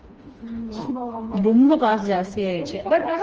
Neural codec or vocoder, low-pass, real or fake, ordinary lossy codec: codec, 16 kHz, 2 kbps, FreqCodec, smaller model; 7.2 kHz; fake; Opus, 24 kbps